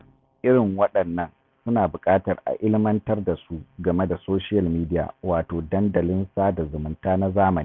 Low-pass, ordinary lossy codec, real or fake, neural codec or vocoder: none; none; real; none